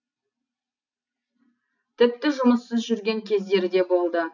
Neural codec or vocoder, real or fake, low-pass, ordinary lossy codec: none; real; 7.2 kHz; none